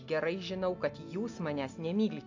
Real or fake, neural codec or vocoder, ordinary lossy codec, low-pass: real; none; AAC, 48 kbps; 7.2 kHz